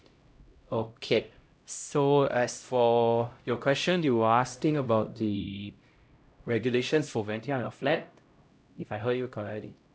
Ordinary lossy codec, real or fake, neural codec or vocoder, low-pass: none; fake; codec, 16 kHz, 0.5 kbps, X-Codec, HuBERT features, trained on LibriSpeech; none